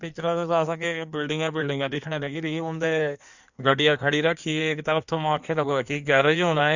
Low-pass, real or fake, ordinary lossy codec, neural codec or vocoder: 7.2 kHz; fake; none; codec, 16 kHz in and 24 kHz out, 1.1 kbps, FireRedTTS-2 codec